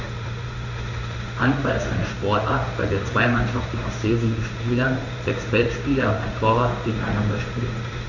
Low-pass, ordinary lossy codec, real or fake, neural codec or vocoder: 7.2 kHz; none; fake; codec, 16 kHz in and 24 kHz out, 1 kbps, XY-Tokenizer